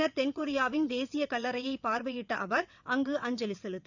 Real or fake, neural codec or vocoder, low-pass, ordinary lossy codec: fake; vocoder, 22.05 kHz, 80 mel bands, WaveNeXt; 7.2 kHz; none